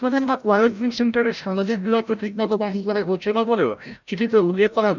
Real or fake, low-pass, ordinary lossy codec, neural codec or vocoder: fake; 7.2 kHz; none; codec, 16 kHz, 0.5 kbps, FreqCodec, larger model